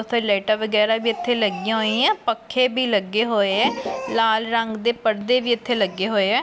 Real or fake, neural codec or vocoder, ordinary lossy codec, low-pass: real; none; none; none